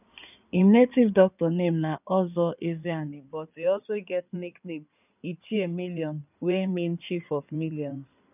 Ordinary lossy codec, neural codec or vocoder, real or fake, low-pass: none; codec, 16 kHz in and 24 kHz out, 2.2 kbps, FireRedTTS-2 codec; fake; 3.6 kHz